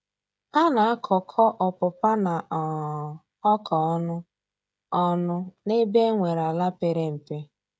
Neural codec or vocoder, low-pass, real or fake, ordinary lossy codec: codec, 16 kHz, 16 kbps, FreqCodec, smaller model; none; fake; none